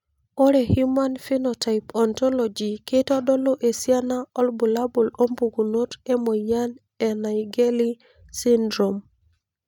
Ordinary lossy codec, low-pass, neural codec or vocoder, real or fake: none; 19.8 kHz; none; real